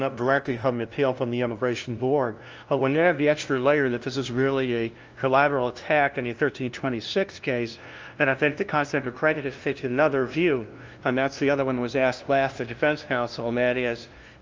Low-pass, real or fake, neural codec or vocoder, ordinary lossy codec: 7.2 kHz; fake; codec, 16 kHz, 0.5 kbps, FunCodec, trained on LibriTTS, 25 frames a second; Opus, 24 kbps